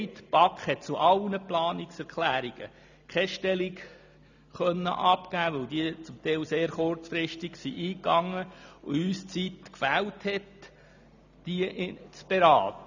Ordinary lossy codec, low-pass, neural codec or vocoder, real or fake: none; 7.2 kHz; none; real